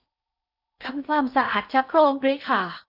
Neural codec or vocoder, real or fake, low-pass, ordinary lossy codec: codec, 16 kHz in and 24 kHz out, 0.6 kbps, FocalCodec, streaming, 4096 codes; fake; 5.4 kHz; none